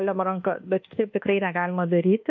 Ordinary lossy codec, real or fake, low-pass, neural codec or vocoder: AAC, 48 kbps; fake; 7.2 kHz; codec, 24 kHz, 1.2 kbps, DualCodec